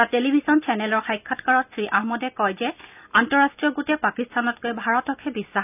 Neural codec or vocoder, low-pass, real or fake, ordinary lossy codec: none; 3.6 kHz; real; none